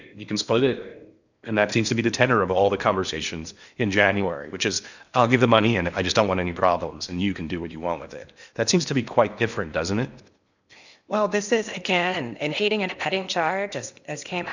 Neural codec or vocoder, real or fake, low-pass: codec, 16 kHz in and 24 kHz out, 0.8 kbps, FocalCodec, streaming, 65536 codes; fake; 7.2 kHz